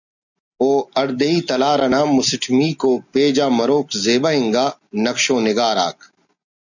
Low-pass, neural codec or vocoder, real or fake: 7.2 kHz; none; real